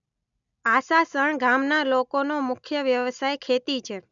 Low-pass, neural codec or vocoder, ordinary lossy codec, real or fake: 7.2 kHz; none; none; real